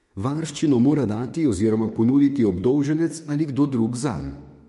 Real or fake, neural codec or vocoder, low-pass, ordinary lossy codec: fake; autoencoder, 48 kHz, 32 numbers a frame, DAC-VAE, trained on Japanese speech; 14.4 kHz; MP3, 48 kbps